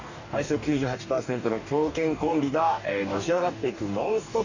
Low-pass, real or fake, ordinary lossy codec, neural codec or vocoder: 7.2 kHz; fake; none; codec, 44.1 kHz, 2.6 kbps, DAC